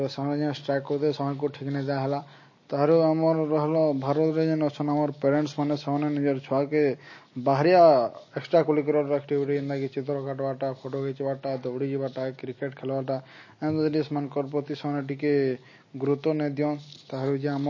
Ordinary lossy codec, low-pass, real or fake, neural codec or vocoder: MP3, 32 kbps; 7.2 kHz; real; none